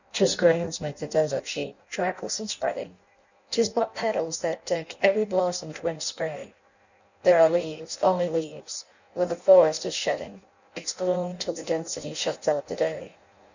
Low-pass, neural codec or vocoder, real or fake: 7.2 kHz; codec, 16 kHz in and 24 kHz out, 0.6 kbps, FireRedTTS-2 codec; fake